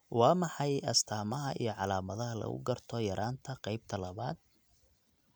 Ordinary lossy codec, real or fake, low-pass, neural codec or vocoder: none; real; none; none